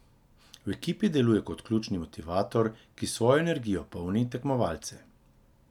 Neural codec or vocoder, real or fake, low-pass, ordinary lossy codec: none; real; 19.8 kHz; none